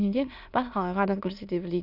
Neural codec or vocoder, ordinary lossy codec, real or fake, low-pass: autoencoder, 22.05 kHz, a latent of 192 numbers a frame, VITS, trained on many speakers; none; fake; 5.4 kHz